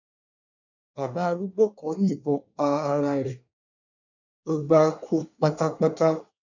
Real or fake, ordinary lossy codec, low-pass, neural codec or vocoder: fake; none; 7.2 kHz; codec, 24 kHz, 1 kbps, SNAC